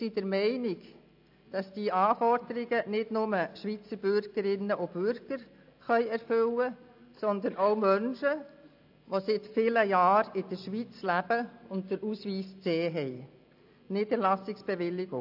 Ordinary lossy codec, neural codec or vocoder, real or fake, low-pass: AAC, 48 kbps; none; real; 5.4 kHz